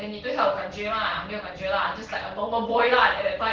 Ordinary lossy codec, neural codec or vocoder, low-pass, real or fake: Opus, 16 kbps; none; 7.2 kHz; real